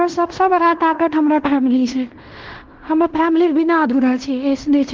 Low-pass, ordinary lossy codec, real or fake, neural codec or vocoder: 7.2 kHz; Opus, 16 kbps; fake; codec, 16 kHz in and 24 kHz out, 0.9 kbps, LongCat-Audio-Codec, fine tuned four codebook decoder